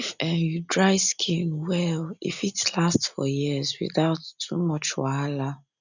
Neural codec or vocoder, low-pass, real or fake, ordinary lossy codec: none; 7.2 kHz; real; none